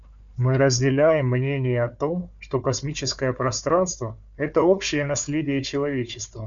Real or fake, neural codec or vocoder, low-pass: fake; codec, 16 kHz, 4 kbps, FunCodec, trained on Chinese and English, 50 frames a second; 7.2 kHz